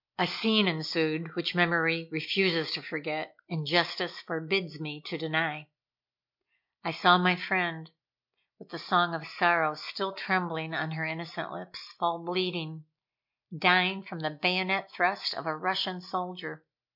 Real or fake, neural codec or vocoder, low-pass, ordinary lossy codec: real; none; 5.4 kHz; MP3, 48 kbps